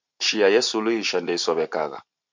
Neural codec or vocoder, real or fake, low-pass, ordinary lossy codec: vocoder, 44.1 kHz, 128 mel bands every 512 samples, BigVGAN v2; fake; 7.2 kHz; MP3, 64 kbps